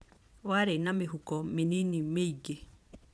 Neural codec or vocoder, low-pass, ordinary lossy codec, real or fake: vocoder, 22.05 kHz, 80 mel bands, Vocos; none; none; fake